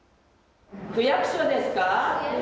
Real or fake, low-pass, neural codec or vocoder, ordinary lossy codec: real; none; none; none